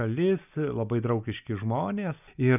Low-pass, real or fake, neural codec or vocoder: 3.6 kHz; real; none